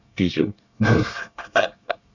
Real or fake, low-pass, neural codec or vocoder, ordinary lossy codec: fake; 7.2 kHz; codec, 24 kHz, 1 kbps, SNAC; none